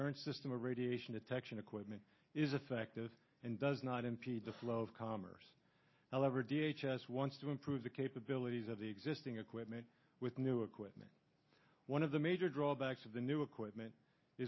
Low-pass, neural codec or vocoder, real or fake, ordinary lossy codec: 7.2 kHz; none; real; MP3, 24 kbps